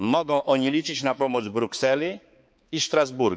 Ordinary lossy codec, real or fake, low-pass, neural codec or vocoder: none; fake; none; codec, 16 kHz, 4 kbps, X-Codec, HuBERT features, trained on balanced general audio